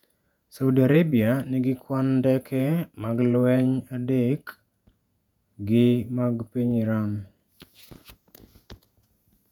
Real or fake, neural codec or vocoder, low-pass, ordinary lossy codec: fake; vocoder, 48 kHz, 128 mel bands, Vocos; 19.8 kHz; none